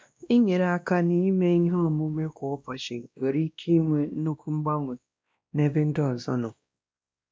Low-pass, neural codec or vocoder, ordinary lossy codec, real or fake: none; codec, 16 kHz, 1 kbps, X-Codec, WavLM features, trained on Multilingual LibriSpeech; none; fake